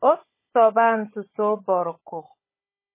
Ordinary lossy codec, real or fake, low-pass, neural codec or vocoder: MP3, 16 kbps; fake; 3.6 kHz; codec, 16 kHz, 16 kbps, FunCodec, trained on Chinese and English, 50 frames a second